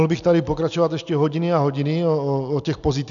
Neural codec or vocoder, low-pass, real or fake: none; 7.2 kHz; real